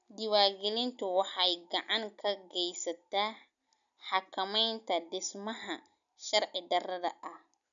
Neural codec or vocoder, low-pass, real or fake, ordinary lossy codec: none; 7.2 kHz; real; none